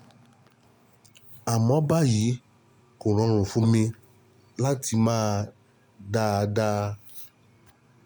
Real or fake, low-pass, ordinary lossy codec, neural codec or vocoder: real; none; none; none